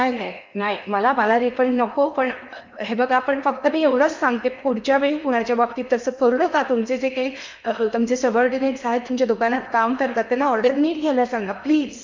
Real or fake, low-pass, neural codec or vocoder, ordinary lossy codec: fake; 7.2 kHz; codec, 16 kHz in and 24 kHz out, 0.8 kbps, FocalCodec, streaming, 65536 codes; MP3, 64 kbps